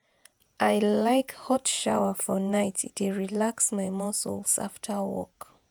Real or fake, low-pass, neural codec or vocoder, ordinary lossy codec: fake; none; vocoder, 48 kHz, 128 mel bands, Vocos; none